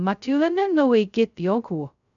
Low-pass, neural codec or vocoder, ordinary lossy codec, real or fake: 7.2 kHz; codec, 16 kHz, 0.2 kbps, FocalCodec; none; fake